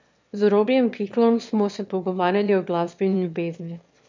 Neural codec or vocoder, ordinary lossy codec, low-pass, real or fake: autoencoder, 22.05 kHz, a latent of 192 numbers a frame, VITS, trained on one speaker; MP3, 64 kbps; 7.2 kHz; fake